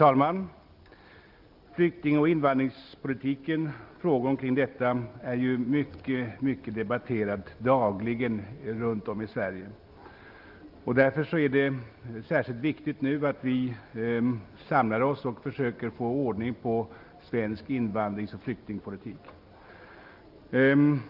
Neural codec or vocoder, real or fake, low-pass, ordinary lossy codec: none; real; 5.4 kHz; Opus, 24 kbps